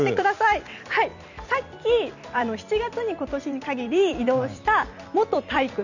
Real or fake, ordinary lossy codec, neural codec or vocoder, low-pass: fake; none; vocoder, 44.1 kHz, 128 mel bands every 256 samples, BigVGAN v2; 7.2 kHz